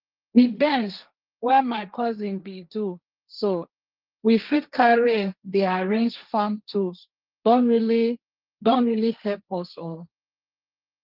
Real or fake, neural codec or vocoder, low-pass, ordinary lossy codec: fake; codec, 16 kHz, 1.1 kbps, Voila-Tokenizer; 5.4 kHz; Opus, 32 kbps